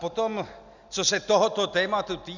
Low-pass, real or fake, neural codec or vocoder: 7.2 kHz; real; none